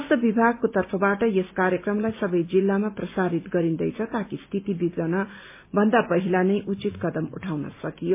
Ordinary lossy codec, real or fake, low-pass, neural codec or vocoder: MP3, 24 kbps; real; 3.6 kHz; none